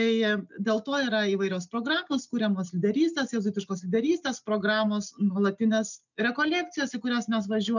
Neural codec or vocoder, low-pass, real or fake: none; 7.2 kHz; real